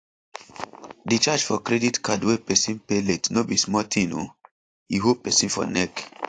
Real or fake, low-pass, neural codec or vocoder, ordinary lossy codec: real; 9.9 kHz; none; AAC, 48 kbps